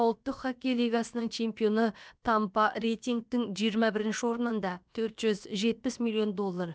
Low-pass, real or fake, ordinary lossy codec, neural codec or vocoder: none; fake; none; codec, 16 kHz, about 1 kbps, DyCAST, with the encoder's durations